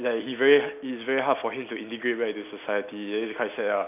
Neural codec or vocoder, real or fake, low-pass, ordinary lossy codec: none; real; 3.6 kHz; none